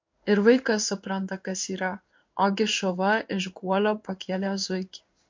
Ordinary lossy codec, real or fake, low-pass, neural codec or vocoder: MP3, 48 kbps; fake; 7.2 kHz; codec, 16 kHz in and 24 kHz out, 1 kbps, XY-Tokenizer